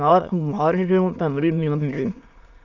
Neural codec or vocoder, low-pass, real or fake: autoencoder, 22.05 kHz, a latent of 192 numbers a frame, VITS, trained on many speakers; 7.2 kHz; fake